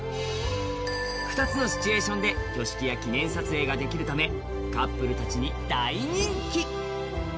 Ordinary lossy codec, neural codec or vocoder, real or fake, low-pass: none; none; real; none